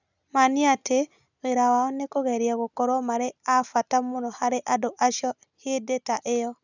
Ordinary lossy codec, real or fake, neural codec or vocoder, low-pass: none; real; none; 7.2 kHz